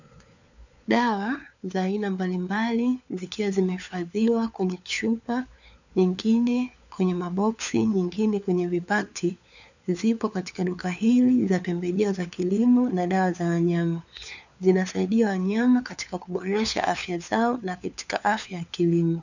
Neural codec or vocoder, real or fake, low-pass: codec, 16 kHz, 4 kbps, FunCodec, trained on LibriTTS, 50 frames a second; fake; 7.2 kHz